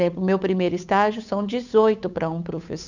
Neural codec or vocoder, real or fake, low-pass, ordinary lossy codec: codec, 16 kHz, 8 kbps, FunCodec, trained on Chinese and English, 25 frames a second; fake; 7.2 kHz; none